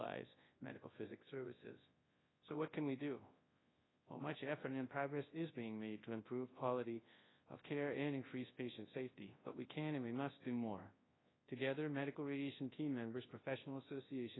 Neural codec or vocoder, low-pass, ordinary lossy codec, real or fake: codec, 24 kHz, 0.9 kbps, WavTokenizer, large speech release; 7.2 kHz; AAC, 16 kbps; fake